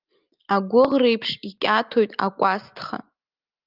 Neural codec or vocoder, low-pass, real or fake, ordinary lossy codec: none; 5.4 kHz; real; Opus, 32 kbps